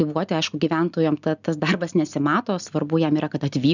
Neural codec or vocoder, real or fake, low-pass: none; real; 7.2 kHz